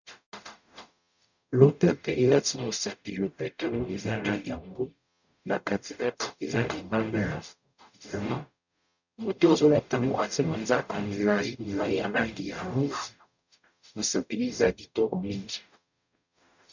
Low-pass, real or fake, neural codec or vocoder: 7.2 kHz; fake; codec, 44.1 kHz, 0.9 kbps, DAC